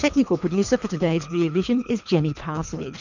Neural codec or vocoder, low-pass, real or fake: codec, 24 kHz, 3 kbps, HILCodec; 7.2 kHz; fake